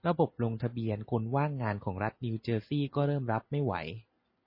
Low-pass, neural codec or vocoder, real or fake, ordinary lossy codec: 5.4 kHz; none; real; MP3, 24 kbps